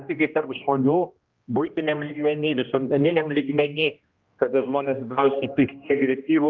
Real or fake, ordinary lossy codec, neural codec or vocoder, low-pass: fake; Opus, 32 kbps; codec, 16 kHz, 1 kbps, X-Codec, HuBERT features, trained on general audio; 7.2 kHz